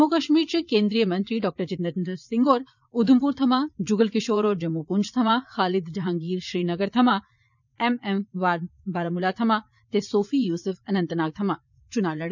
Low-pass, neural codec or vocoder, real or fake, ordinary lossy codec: 7.2 kHz; vocoder, 44.1 kHz, 80 mel bands, Vocos; fake; none